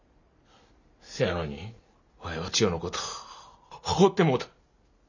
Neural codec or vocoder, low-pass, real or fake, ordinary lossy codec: none; 7.2 kHz; real; none